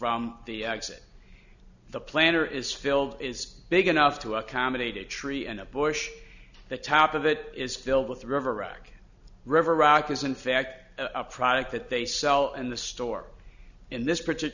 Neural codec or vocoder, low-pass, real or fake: none; 7.2 kHz; real